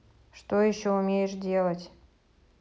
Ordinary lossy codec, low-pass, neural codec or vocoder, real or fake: none; none; none; real